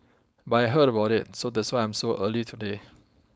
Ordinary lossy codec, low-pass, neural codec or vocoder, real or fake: none; none; codec, 16 kHz, 4.8 kbps, FACodec; fake